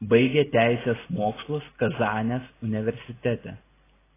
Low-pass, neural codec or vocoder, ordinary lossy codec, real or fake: 3.6 kHz; none; AAC, 16 kbps; real